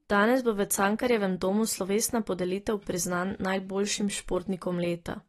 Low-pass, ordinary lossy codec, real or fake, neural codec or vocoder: 19.8 kHz; AAC, 32 kbps; real; none